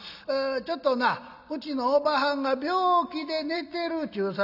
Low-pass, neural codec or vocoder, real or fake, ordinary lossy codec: 5.4 kHz; none; real; none